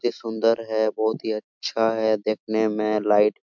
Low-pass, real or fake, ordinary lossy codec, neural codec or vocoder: 7.2 kHz; real; MP3, 64 kbps; none